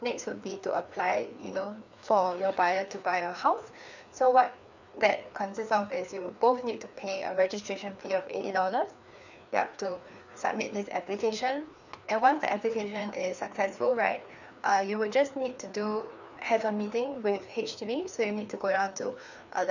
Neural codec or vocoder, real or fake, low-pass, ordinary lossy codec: codec, 16 kHz, 2 kbps, FreqCodec, larger model; fake; 7.2 kHz; none